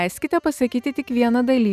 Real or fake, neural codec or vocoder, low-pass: real; none; 14.4 kHz